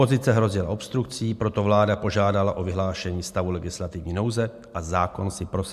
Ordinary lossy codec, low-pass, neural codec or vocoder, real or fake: MP3, 96 kbps; 14.4 kHz; none; real